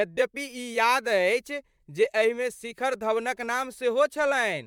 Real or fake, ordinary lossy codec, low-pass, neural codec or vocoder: fake; MP3, 96 kbps; 19.8 kHz; vocoder, 44.1 kHz, 128 mel bands, Pupu-Vocoder